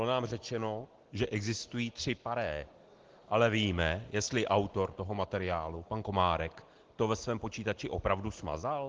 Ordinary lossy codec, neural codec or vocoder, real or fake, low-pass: Opus, 16 kbps; none; real; 7.2 kHz